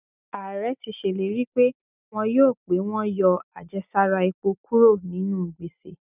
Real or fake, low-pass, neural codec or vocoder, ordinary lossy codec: real; 3.6 kHz; none; none